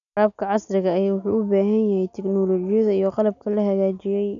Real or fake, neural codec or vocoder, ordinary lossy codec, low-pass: real; none; none; 7.2 kHz